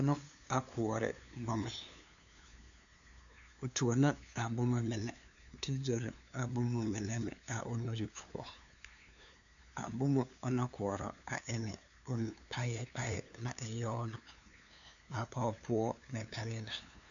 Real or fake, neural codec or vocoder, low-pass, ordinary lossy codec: fake; codec, 16 kHz, 2 kbps, FunCodec, trained on LibriTTS, 25 frames a second; 7.2 kHz; MP3, 96 kbps